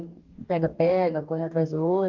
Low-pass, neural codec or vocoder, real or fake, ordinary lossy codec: 7.2 kHz; codec, 44.1 kHz, 2.6 kbps, DAC; fake; Opus, 24 kbps